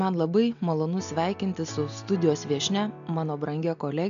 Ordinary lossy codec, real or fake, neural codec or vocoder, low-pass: AAC, 96 kbps; real; none; 7.2 kHz